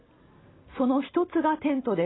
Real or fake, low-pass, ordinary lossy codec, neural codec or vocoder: real; 7.2 kHz; AAC, 16 kbps; none